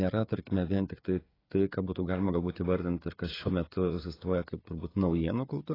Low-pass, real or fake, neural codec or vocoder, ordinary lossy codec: 5.4 kHz; fake; codec, 16 kHz, 16 kbps, FreqCodec, smaller model; AAC, 24 kbps